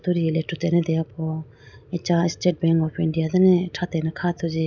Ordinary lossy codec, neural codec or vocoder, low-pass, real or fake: none; none; 7.2 kHz; real